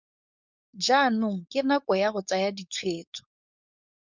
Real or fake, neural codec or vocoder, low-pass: fake; codec, 16 kHz, 4.8 kbps, FACodec; 7.2 kHz